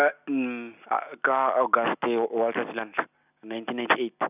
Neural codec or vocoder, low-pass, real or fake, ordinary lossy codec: none; 3.6 kHz; real; none